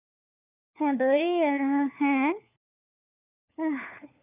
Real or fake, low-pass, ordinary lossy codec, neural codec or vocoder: fake; 3.6 kHz; none; codec, 16 kHz, 4 kbps, FunCodec, trained on LibriTTS, 50 frames a second